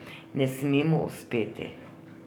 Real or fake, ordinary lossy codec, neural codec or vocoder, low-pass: fake; none; codec, 44.1 kHz, 7.8 kbps, DAC; none